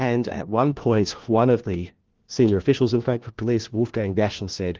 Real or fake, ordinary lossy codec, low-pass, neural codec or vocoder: fake; Opus, 16 kbps; 7.2 kHz; codec, 16 kHz, 1 kbps, FunCodec, trained on LibriTTS, 50 frames a second